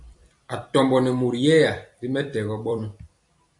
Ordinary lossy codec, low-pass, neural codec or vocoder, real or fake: AAC, 64 kbps; 10.8 kHz; vocoder, 44.1 kHz, 128 mel bands every 256 samples, BigVGAN v2; fake